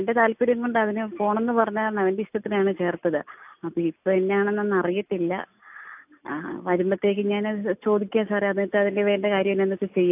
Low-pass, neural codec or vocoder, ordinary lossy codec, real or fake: 3.6 kHz; none; AAC, 32 kbps; real